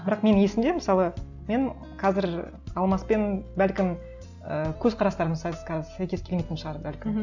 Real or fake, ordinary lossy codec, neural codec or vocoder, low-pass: real; none; none; 7.2 kHz